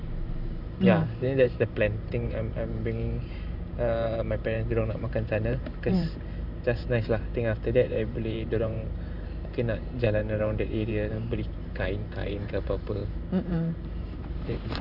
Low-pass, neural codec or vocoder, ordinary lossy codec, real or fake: 5.4 kHz; vocoder, 44.1 kHz, 128 mel bands every 512 samples, BigVGAN v2; none; fake